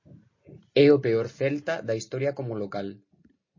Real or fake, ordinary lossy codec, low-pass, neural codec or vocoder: real; MP3, 32 kbps; 7.2 kHz; none